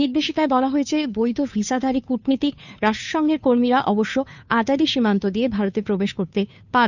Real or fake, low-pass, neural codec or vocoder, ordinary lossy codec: fake; 7.2 kHz; codec, 16 kHz, 2 kbps, FunCodec, trained on Chinese and English, 25 frames a second; none